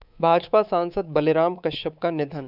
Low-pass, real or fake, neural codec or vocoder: 5.4 kHz; fake; codec, 24 kHz, 3.1 kbps, DualCodec